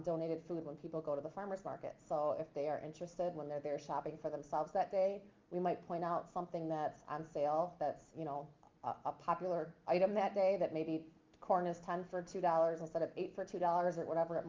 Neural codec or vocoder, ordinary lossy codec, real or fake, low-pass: none; Opus, 24 kbps; real; 7.2 kHz